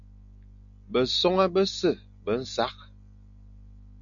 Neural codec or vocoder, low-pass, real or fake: none; 7.2 kHz; real